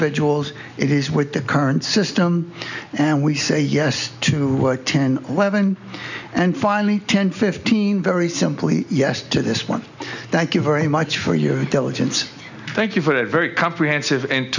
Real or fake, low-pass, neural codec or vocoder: real; 7.2 kHz; none